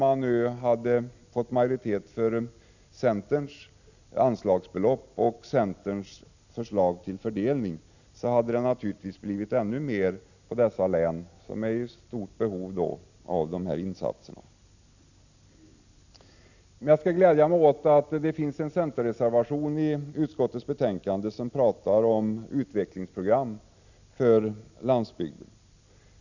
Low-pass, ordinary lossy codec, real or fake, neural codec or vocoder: 7.2 kHz; none; real; none